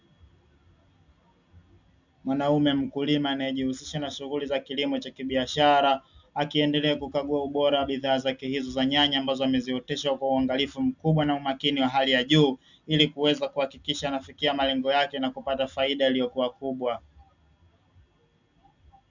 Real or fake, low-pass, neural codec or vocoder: real; 7.2 kHz; none